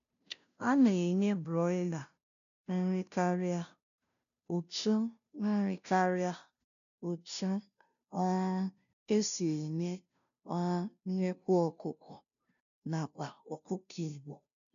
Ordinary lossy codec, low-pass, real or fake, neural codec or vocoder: none; 7.2 kHz; fake; codec, 16 kHz, 0.5 kbps, FunCodec, trained on Chinese and English, 25 frames a second